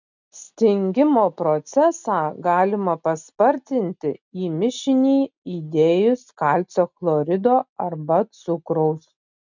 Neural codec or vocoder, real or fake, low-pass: none; real; 7.2 kHz